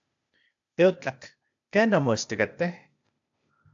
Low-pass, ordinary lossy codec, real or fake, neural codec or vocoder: 7.2 kHz; AAC, 64 kbps; fake; codec, 16 kHz, 0.8 kbps, ZipCodec